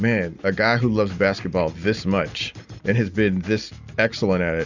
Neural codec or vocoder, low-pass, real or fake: none; 7.2 kHz; real